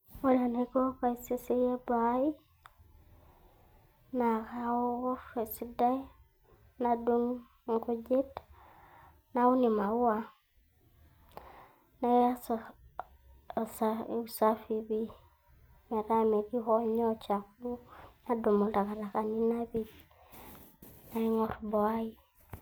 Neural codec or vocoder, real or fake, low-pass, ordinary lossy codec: none; real; none; none